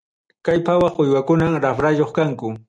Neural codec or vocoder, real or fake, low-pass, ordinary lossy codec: none; real; 9.9 kHz; MP3, 96 kbps